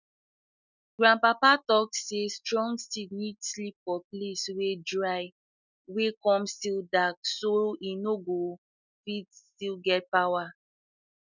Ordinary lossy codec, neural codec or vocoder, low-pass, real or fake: none; none; 7.2 kHz; real